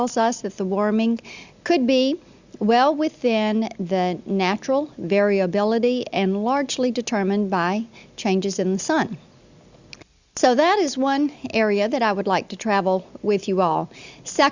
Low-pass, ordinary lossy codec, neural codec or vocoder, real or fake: 7.2 kHz; Opus, 64 kbps; none; real